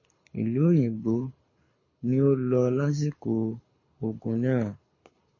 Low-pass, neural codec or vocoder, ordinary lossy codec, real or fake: 7.2 kHz; codec, 24 kHz, 6 kbps, HILCodec; MP3, 32 kbps; fake